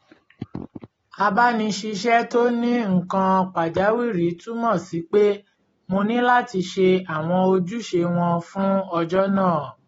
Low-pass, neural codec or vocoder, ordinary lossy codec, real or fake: 19.8 kHz; none; AAC, 24 kbps; real